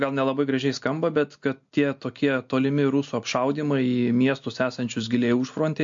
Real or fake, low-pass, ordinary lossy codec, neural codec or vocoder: real; 7.2 kHz; MP3, 48 kbps; none